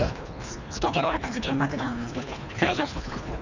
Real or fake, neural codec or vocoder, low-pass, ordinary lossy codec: fake; codec, 24 kHz, 1.5 kbps, HILCodec; 7.2 kHz; none